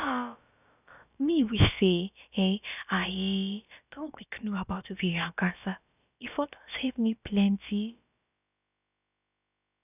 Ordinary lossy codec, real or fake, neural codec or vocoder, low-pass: none; fake; codec, 16 kHz, about 1 kbps, DyCAST, with the encoder's durations; 3.6 kHz